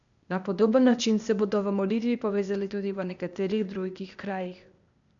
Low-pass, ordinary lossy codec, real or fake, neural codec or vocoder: 7.2 kHz; none; fake; codec, 16 kHz, 0.8 kbps, ZipCodec